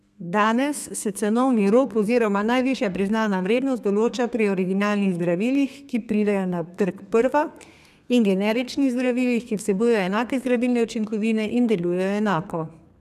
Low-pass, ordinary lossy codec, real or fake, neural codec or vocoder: 14.4 kHz; none; fake; codec, 32 kHz, 1.9 kbps, SNAC